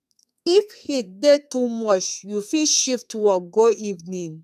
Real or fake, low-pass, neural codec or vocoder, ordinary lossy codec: fake; 14.4 kHz; codec, 32 kHz, 1.9 kbps, SNAC; none